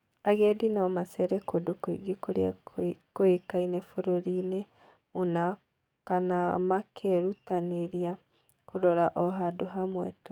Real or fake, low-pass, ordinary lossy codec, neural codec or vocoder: fake; 19.8 kHz; none; codec, 44.1 kHz, 7.8 kbps, DAC